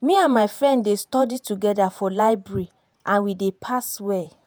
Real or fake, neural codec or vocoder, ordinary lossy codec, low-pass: fake; vocoder, 48 kHz, 128 mel bands, Vocos; none; none